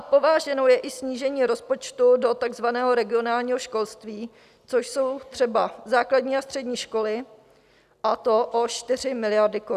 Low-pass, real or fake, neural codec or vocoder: 14.4 kHz; real; none